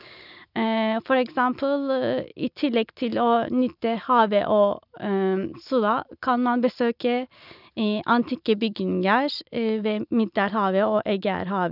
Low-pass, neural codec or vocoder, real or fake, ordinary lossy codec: 5.4 kHz; none; real; none